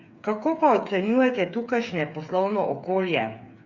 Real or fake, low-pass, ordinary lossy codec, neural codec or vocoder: fake; 7.2 kHz; Opus, 64 kbps; codec, 16 kHz, 8 kbps, FreqCodec, smaller model